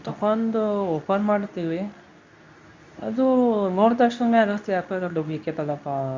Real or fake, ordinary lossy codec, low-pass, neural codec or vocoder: fake; MP3, 64 kbps; 7.2 kHz; codec, 24 kHz, 0.9 kbps, WavTokenizer, medium speech release version 2